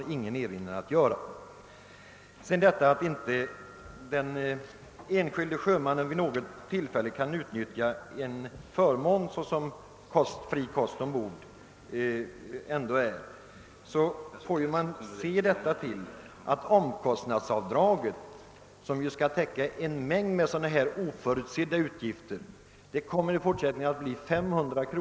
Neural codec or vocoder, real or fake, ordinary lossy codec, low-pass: none; real; none; none